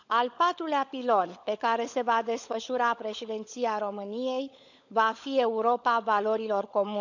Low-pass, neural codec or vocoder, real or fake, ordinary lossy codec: 7.2 kHz; codec, 16 kHz, 16 kbps, FunCodec, trained on LibriTTS, 50 frames a second; fake; none